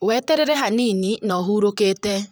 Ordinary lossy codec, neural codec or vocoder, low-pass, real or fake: none; vocoder, 44.1 kHz, 128 mel bands, Pupu-Vocoder; none; fake